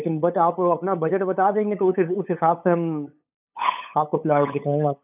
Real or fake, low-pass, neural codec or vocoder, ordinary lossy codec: fake; 3.6 kHz; codec, 16 kHz, 8 kbps, FunCodec, trained on LibriTTS, 25 frames a second; none